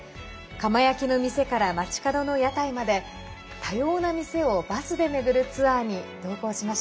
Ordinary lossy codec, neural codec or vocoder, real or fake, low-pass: none; none; real; none